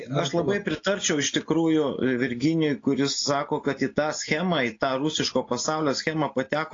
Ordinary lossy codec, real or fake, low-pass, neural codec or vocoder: AAC, 32 kbps; real; 7.2 kHz; none